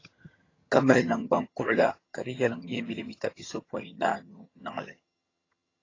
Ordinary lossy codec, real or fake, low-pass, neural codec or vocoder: AAC, 32 kbps; fake; 7.2 kHz; vocoder, 22.05 kHz, 80 mel bands, HiFi-GAN